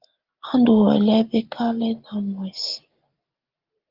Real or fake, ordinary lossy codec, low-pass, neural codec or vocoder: real; Opus, 32 kbps; 5.4 kHz; none